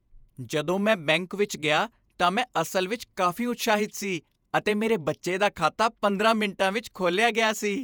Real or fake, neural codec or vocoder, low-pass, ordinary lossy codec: fake; vocoder, 48 kHz, 128 mel bands, Vocos; none; none